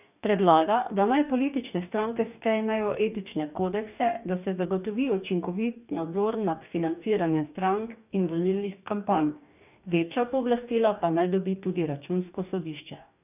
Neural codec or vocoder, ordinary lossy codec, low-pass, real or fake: codec, 44.1 kHz, 2.6 kbps, DAC; none; 3.6 kHz; fake